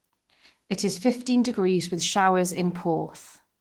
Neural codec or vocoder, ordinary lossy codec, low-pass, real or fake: autoencoder, 48 kHz, 32 numbers a frame, DAC-VAE, trained on Japanese speech; Opus, 16 kbps; 19.8 kHz; fake